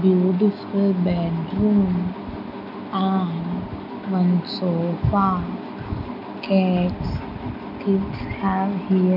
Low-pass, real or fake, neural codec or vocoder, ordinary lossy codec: 5.4 kHz; real; none; none